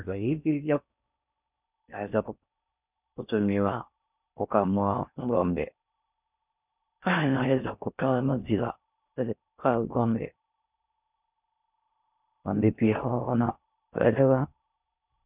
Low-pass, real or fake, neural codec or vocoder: 3.6 kHz; fake; codec, 16 kHz in and 24 kHz out, 0.6 kbps, FocalCodec, streaming, 4096 codes